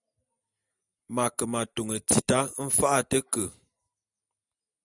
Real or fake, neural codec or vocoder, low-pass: real; none; 10.8 kHz